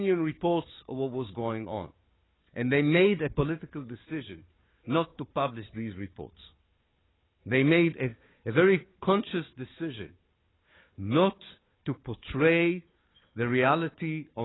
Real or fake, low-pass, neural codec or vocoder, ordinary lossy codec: fake; 7.2 kHz; codec, 16 kHz, 4 kbps, FunCodec, trained on Chinese and English, 50 frames a second; AAC, 16 kbps